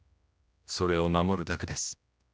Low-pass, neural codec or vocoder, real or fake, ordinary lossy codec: none; codec, 16 kHz, 1 kbps, X-Codec, HuBERT features, trained on general audio; fake; none